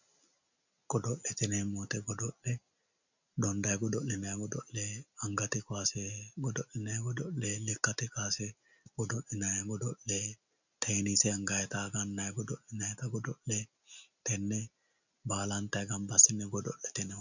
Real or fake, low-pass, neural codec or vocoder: real; 7.2 kHz; none